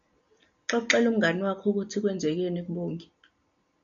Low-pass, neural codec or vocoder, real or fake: 7.2 kHz; none; real